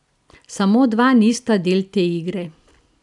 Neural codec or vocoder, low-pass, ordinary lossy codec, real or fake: none; 10.8 kHz; none; real